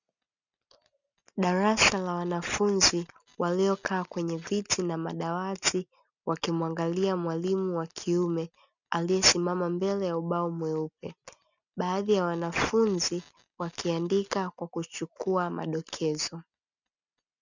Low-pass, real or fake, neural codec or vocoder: 7.2 kHz; real; none